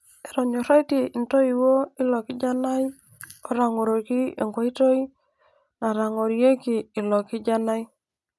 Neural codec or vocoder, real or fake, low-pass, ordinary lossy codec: none; real; none; none